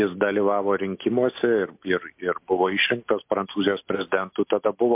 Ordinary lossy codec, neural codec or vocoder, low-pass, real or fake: MP3, 32 kbps; none; 3.6 kHz; real